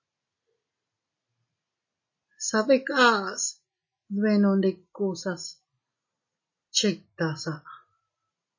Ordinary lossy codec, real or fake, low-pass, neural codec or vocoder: MP3, 32 kbps; real; 7.2 kHz; none